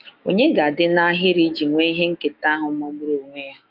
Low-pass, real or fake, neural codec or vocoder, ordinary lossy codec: 5.4 kHz; fake; autoencoder, 48 kHz, 128 numbers a frame, DAC-VAE, trained on Japanese speech; Opus, 24 kbps